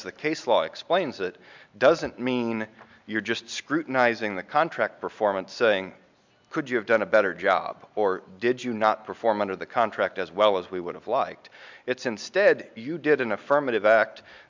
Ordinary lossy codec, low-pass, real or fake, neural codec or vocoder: MP3, 64 kbps; 7.2 kHz; real; none